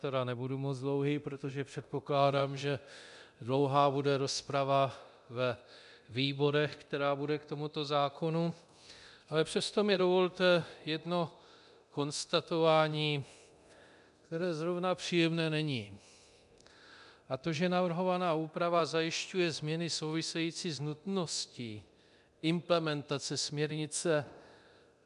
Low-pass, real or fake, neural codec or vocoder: 10.8 kHz; fake; codec, 24 kHz, 0.9 kbps, DualCodec